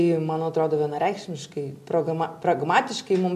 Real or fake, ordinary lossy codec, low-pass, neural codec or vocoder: real; MP3, 64 kbps; 14.4 kHz; none